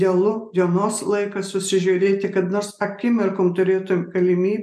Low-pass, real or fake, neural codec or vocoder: 14.4 kHz; real; none